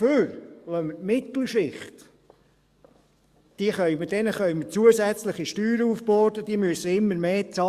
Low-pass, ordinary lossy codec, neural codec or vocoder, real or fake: 14.4 kHz; Opus, 64 kbps; codec, 44.1 kHz, 7.8 kbps, DAC; fake